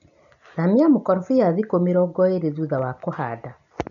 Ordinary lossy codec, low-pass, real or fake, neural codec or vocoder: none; 7.2 kHz; real; none